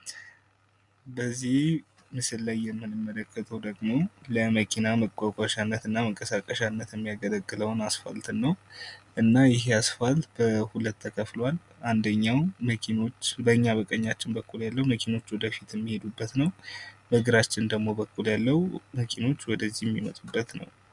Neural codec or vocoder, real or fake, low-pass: none; real; 10.8 kHz